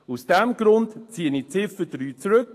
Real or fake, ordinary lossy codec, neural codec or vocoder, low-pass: fake; AAC, 48 kbps; autoencoder, 48 kHz, 128 numbers a frame, DAC-VAE, trained on Japanese speech; 14.4 kHz